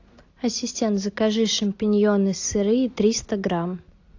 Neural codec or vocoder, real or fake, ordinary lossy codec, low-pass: none; real; AAC, 48 kbps; 7.2 kHz